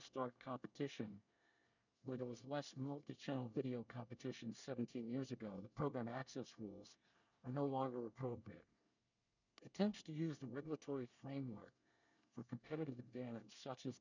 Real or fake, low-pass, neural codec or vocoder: fake; 7.2 kHz; codec, 24 kHz, 1 kbps, SNAC